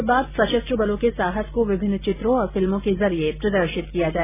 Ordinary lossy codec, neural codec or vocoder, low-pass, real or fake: none; none; 3.6 kHz; real